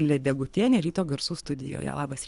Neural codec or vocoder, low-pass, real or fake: codec, 24 kHz, 3 kbps, HILCodec; 10.8 kHz; fake